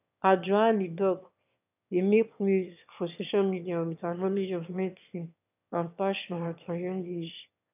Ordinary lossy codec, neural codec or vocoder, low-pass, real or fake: none; autoencoder, 22.05 kHz, a latent of 192 numbers a frame, VITS, trained on one speaker; 3.6 kHz; fake